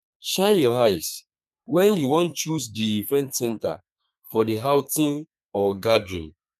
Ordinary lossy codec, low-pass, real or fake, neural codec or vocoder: none; 14.4 kHz; fake; codec, 32 kHz, 1.9 kbps, SNAC